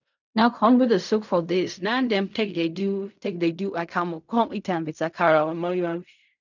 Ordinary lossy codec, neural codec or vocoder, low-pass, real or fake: none; codec, 16 kHz in and 24 kHz out, 0.4 kbps, LongCat-Audio-Codec, fine tuned four codebook decoder; 7.2 kHz; fake